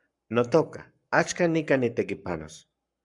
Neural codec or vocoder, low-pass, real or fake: codec, 44.1 kHz, 7.8 kbps, Pupu-Codec; 10.8 kHz; fake